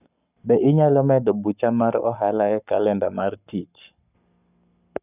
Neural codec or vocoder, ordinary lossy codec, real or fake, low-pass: codec, 44.1 kHz, 7.8 kbps, Pupu-Codec; none; fake; 3.6 kHz